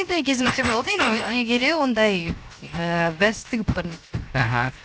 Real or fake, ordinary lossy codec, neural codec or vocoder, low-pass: fake; none; codec, 16 kHz, 0.7 kbps, FocalCodec; none